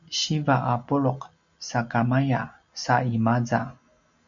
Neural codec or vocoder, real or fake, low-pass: none; real; 7.2 kHz